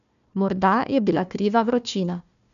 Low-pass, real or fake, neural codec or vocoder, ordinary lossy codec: 7.2 kHz; fake; codec, 16 kHz, 1 kbps, FunCodec, trained on Chinese and English, 50 frames a second; none